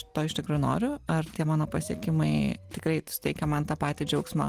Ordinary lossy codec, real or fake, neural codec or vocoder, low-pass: Opus, 24 kbps; real; none; 14.4 kHz